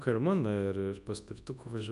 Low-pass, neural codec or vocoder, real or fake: 10.8 kHz; codec, 24 kHz, 0.9 kbps, WavTokenizer, large speech release; fake